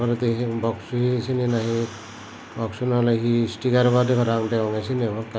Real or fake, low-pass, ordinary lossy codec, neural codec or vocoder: real; none; none; none